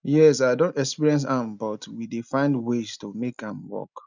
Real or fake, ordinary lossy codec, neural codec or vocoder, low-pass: real; none; none; 7.2 kHz